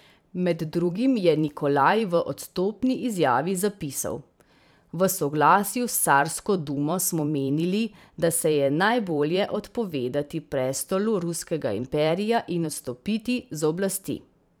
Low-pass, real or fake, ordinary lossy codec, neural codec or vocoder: none; fake; none; vocoder, 44.1 kHz, 128 mel bands every 512 samples, BigVGAN v2